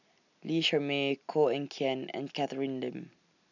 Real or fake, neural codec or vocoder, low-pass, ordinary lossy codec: real; none; 7.2 kHz; none